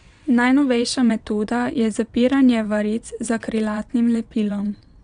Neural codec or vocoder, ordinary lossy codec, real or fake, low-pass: vocoder, 22.05 kHz, 80 mel bands, WaveNeXt; none; fake; 9.9 kHz